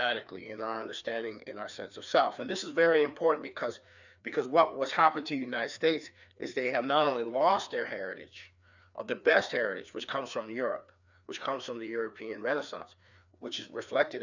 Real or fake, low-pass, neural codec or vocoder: fake; 7.2 kHz; codec, 16 kHz, 2 kbps, FreqCodec, larger model